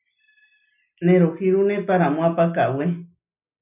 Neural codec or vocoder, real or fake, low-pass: none; real; 3.6 kHz